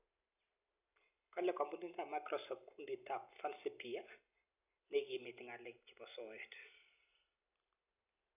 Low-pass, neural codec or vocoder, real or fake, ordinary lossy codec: 3.6 kHz; none; real; none